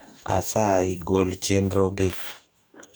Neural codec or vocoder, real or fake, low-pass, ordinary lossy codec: codec, 44.1 kHz, 2.6 kbps, DAC; fake; none; none